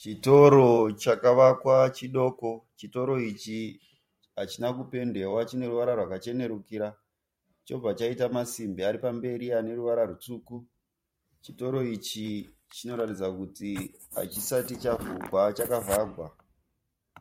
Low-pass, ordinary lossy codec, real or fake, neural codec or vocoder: 19.8 kHz; MP3, 64 kbps; fake; vocoder, 44.1 kHz, 128 mel bands every 512 samples, BigVGAN v2